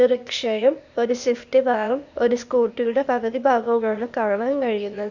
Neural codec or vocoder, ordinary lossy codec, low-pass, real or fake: codec, 16 kHz, 0.8 kbps, ZipCodec; none; 7.2 kHz; fake